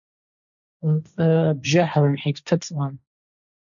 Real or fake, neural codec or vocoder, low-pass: fake; codec, 16 kHz, 1.1 kbps, Voila-Tokenizer; 7.2 kHz